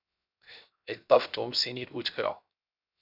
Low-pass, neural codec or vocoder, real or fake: 5.4 kHz; codec, 16 kHz, 0.7 kbps, FocalCodec; fake